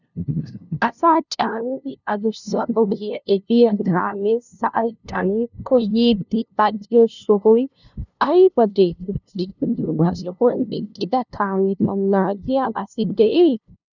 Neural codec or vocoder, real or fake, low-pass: codec, 16 kHz, 0.5 kbps, FunCodec, trained on LibriTTS, 25 frames a second; fake; 7.2 kHz